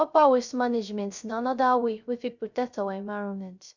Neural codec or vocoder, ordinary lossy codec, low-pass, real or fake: codec, 16 kHz, 0.3 kbps, FocalCodec; none; 7.2 kHz; fake